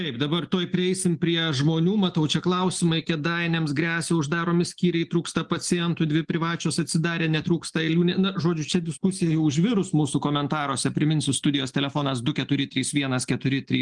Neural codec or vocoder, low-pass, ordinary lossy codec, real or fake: none; 10.8 kHz; Opus, 24 kbps; real